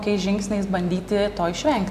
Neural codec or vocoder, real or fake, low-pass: none; real; 14.4 kHz